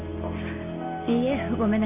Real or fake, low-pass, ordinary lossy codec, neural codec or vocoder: real; 3.6 kHz; none; none